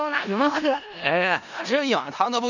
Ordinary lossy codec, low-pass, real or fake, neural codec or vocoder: none; 7.2 kHz; fake; codec, 16 kHz in and 24 kHz out, 0.4 kbps, LongCat-Audio-Codec, four codebook decoder